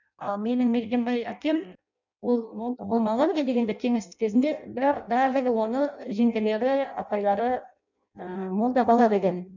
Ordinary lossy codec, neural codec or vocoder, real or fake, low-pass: none; codec, 16 kHz in and 24 kHz out, 0.6 kbps, FireRedTTS-2 codec; fake; 7.2 kHz